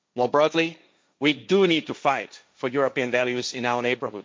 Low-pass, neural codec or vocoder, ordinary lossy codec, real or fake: none; codec, 16 kHz, 1.1 kbps, Voila-Tokenizer; none; fake